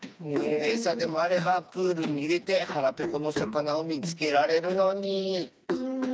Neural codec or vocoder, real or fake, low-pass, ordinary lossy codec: codec, 16 kHz, 2 kbps, FreqCodec, smaller model; fake; none; none